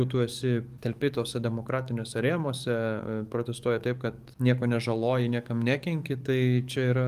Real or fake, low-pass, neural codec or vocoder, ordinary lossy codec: fake; 14.4 kHz; codec, 44.1 kHz, 7.8 kbps, DAC; Opus, 32 kbps